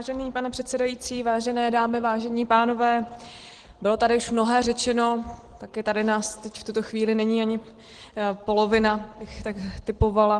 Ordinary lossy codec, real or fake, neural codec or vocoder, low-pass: Opus, 16 kbps; real; none; 9.9 kHz